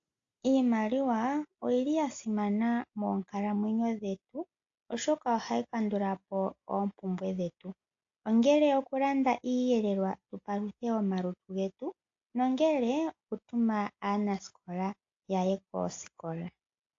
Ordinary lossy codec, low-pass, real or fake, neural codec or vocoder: AAC, 48 kbps; 7.2 kHz; real; none